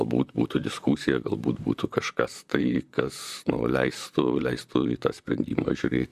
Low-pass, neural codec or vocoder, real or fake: 14.4 kHz; vocoder, 44.1 kHz, 128 mel bands, Pupu-Vocoder; fake